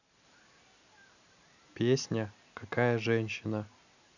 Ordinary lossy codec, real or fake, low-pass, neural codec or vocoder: none; real; 7.2 kHz; none